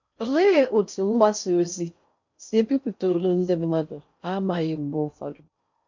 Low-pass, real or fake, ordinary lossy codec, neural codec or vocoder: 7.2 kHz; fake; MP3, 48 kbps; codec, 16 kHz in and 24 kHz out, 0.6 kbps, FocalCodec, streaming, 4096 codes